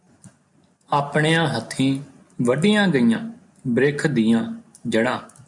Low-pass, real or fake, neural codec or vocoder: 10.8 kHz; real; none